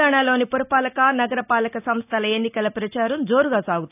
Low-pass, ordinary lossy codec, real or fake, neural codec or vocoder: 3.6 kHz; none; real; none